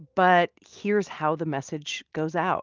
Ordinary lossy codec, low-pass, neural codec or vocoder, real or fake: Opus, 32 kbps; 7.2 kHz; none; real